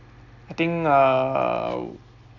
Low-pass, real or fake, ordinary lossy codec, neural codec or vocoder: 7.2 kHz; real; none; none